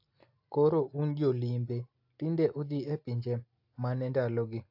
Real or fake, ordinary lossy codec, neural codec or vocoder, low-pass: real; AAC, 48 kbps; none; 5.4 kHz